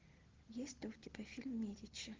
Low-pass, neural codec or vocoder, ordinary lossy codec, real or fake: 7.2 kHz; none; Opus, 16 kbps; real